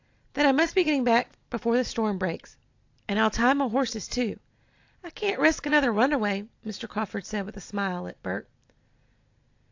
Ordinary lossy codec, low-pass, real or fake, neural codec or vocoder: AAC, 48 kbps; 7.2 kHz; real; none